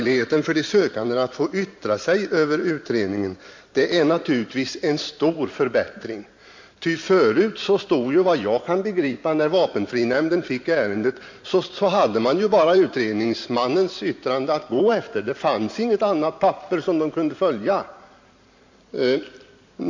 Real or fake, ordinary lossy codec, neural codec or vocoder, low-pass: fake; MP3, 48 kbps; vocoder, 44.1 kHz, 128 mel bands every 512 samples, BigVGAN v2; 7.2 kHz